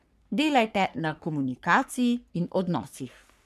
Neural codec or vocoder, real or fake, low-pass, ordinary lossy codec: codec, 44.1 kHz, 3.4 kbps, Pupu-Codec; fake; 14.4 kHz; none